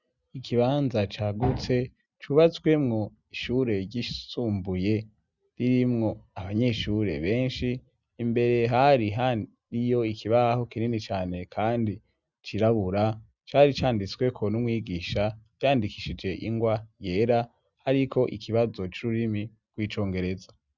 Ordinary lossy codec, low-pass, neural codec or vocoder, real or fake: Opus, 64 kbps; 7.2 kHz; none; real